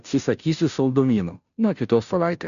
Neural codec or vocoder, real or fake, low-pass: codec, 16 kHz, 0.5 kbps, FunCodec, trained on Chinese and English, 25 frames a second; fake; 7.2 kHz